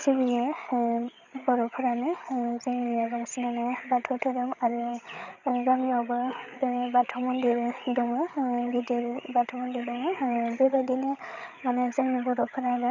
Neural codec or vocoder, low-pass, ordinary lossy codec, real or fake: codec, 16 kHz, 8 kbps, FreqCodec, larger model; 7.2 kHz; none; fake